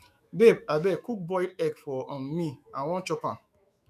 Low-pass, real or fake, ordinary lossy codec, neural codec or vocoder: 14.4 kHz; fake; none; autoencoder, 48 kHz, 128 numbers a frame, DAC-VAE, trained on Japanese speech